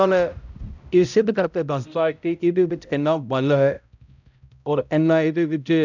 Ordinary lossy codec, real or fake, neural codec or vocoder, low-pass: none; fake; codec, 16 kHz, 0.5 kbps, X-Codec, HuBERT features, trained on balanced general audio; 7.2 kHz